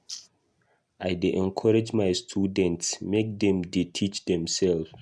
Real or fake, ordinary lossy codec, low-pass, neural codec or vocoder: real; none; none; none